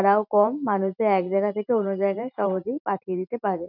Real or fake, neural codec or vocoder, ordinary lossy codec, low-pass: fake; vocoder, 44.1 kHz, 128 mel bands every 512 samples, BigVGAN v2; none; 5.4 kHz